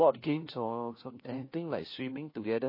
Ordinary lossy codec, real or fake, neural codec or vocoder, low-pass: MP3, 24 kbps; fake; codec, 16 kHz, 1 kbps, FunCodec, trained on LibriTTS, 50 frames a second; 5.4 kHz